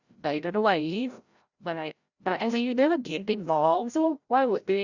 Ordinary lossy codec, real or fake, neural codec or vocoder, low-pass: Opus, 64 kbps; fake; codec, 16 kHz, 0.5 kbps, FreqCodec, larger model; 7.2 kHz